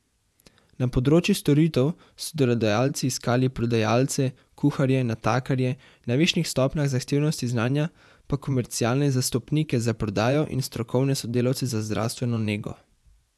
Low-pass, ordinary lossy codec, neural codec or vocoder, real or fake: none; none; vocoder, 24 kHz, 100 mel bands, Vocos; fake